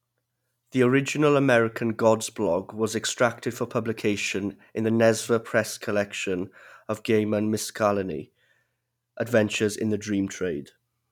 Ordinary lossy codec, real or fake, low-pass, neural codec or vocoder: none; real; 19.8 kHz; none